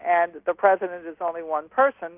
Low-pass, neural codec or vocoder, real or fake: 3.6 kHz; none; real